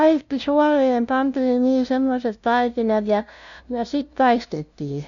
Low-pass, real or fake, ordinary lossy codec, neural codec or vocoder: 7.2 kHz; fake; none; codec, 16 kHz, 0.5 kbps, FunCodec, trained on LibriTTS, 25 frames a second